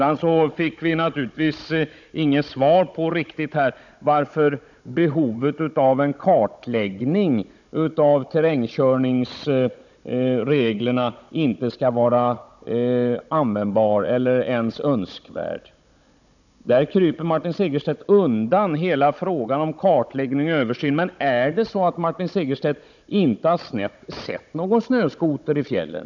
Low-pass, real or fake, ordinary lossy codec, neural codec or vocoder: 7.2 kHz; fake; none; codec, 16 kHz, 16 kbps, FunCodec, trained on Chinese and English, 50 frames a second